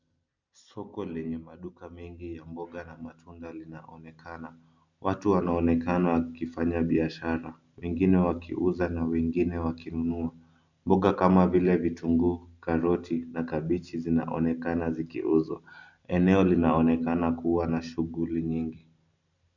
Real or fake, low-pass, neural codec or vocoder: real; 7.2 kHz; none